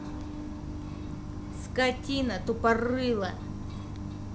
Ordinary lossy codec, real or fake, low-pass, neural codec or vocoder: none; real; none; none